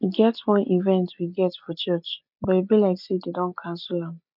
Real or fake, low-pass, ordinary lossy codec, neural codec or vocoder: real; 5.4 kHz; none; none